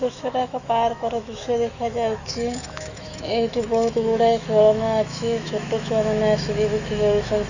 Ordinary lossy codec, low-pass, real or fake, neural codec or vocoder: none; 7.2 kHz; fake; autoencoder, 48 kHz, 128 numbers a frame, DAC-VAE, trained on Japanese speech